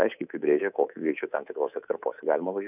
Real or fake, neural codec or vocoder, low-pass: fake; codec, 24 kHz, 3.1 kbps, DualCodec; 3.6 kHz